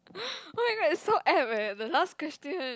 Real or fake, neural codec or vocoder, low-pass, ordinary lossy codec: real; none; none; none